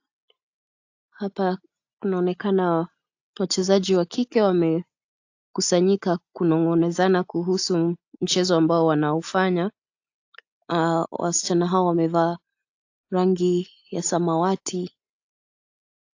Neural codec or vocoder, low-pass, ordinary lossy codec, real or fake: none; 7.2 kHz; AAC, 48 kbps; real